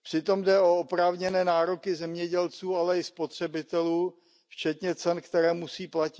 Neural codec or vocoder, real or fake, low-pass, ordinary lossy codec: none; real; none; none